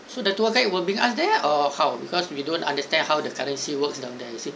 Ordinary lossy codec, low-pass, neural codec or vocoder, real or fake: none; none; none; real